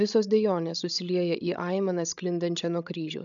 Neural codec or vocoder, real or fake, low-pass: codec, 16 kHz, 16 kbps, FreqCodec, larger model; fake; 7.2 kHz